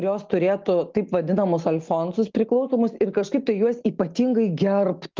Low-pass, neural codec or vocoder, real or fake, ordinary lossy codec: 7.2 kHz; none; real; Opus, 32 kbps